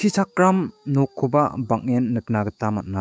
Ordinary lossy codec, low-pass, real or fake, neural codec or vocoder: none; none; fake; codec, 16 kHz, 6 kbps, DAC